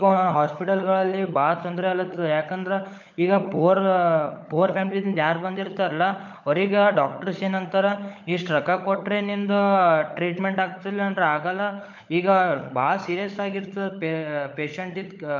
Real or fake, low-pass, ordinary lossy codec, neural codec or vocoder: fake; 7.2 kHz; MP3, 64 kbps; codec, 16 kHz, 16 kbps, FunCodec, trained on LibriTTS, 50 frames a second